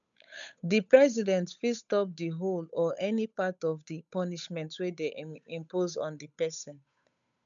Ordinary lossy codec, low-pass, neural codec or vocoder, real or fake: none; 7.2 kHz; codec, 16 kHz, 8 kbps, FunCodec, trained on Chinese and English, 25 frames a second; fake